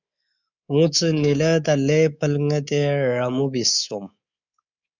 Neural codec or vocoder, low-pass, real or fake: codec, 24 kHz, 3.1 kbps, DualCodec; 7.2 kHz; fake